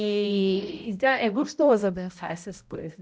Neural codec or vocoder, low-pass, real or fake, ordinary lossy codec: codec, 16 kHz, 0.5 kbps, X-Codec, HuBERT features, trained on balanced general audio; none; fake; none